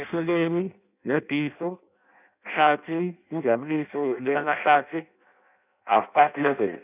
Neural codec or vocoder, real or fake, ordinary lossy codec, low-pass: codec, 16 kHz in and 24 kHz out, 0.6 kbps, FireRedTTS-2 codec; fake; none; 3.6 kHz